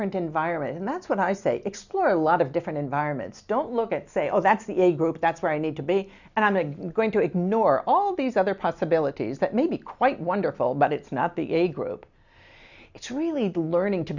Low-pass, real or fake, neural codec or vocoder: 7.2 kHz; real; none